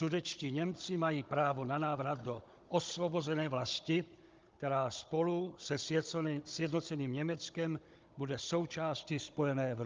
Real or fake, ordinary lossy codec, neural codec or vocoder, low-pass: fake; Opus, 24 kbps; codec, 16 kHz, 16 kbps, FunCodec, trained on Chinese and English, 50 frames a second; 7.2 kHz